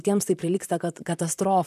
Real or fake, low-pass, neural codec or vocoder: fake; 14.4 kHz; vocoder, 44.1 kHz, 128 mel bands, Pupu-Vocoder